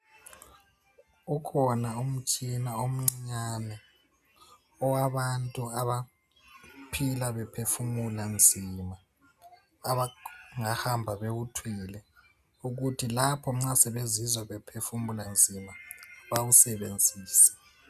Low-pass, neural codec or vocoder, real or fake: 14.4 kHz; none; real